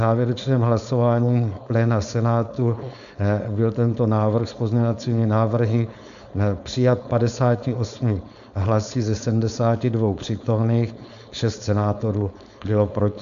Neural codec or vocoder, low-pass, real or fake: codec, 16 kHz, 4.8 kbps, FACodec; 7.2 kHz; fake